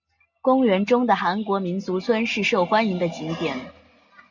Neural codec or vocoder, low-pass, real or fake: none; 7.2 kHz; real